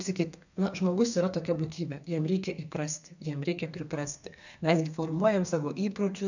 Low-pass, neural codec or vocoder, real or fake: 7.2 kHz; codec, 32 kHz, 1.9 kbps, SNAC; fake